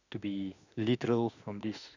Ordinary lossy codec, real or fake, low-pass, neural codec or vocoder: none; fake; 7.2 kHz; autoencoder, 48 kHz, 32 numbers a frame, DAC-VAE, trained on Japanese speech